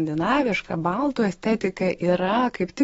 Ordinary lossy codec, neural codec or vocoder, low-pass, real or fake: AAC, 24 kbps; autoencoder, 48 kHz, 128 numbers a frame, DAC-VAE, trained on Japanese speech; 19.8 kHz; fake